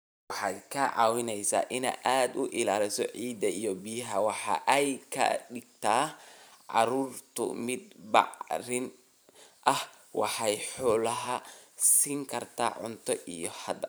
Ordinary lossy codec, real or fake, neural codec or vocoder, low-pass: none; fake; vocoder, 44.1 kHz, 128 mel bands every 512 samples, BigVGAN v2; none